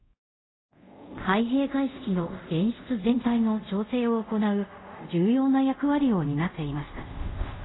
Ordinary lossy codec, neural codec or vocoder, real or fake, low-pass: AAC, 16 kbps; codec, 24 kHz, 0.5 kbps, DualCodec; fake; 7.2 kHz